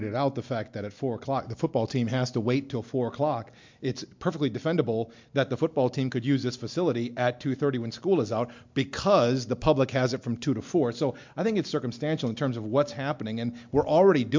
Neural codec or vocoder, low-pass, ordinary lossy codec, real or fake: none; 7.2 kHz; MP3, 64 kbps; real